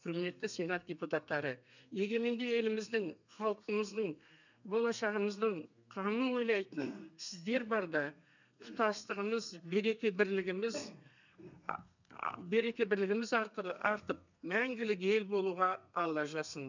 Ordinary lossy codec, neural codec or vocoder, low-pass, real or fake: none; codec, 32 kHz, 1.9 kbps, SNAC; 7.2 kHz; fake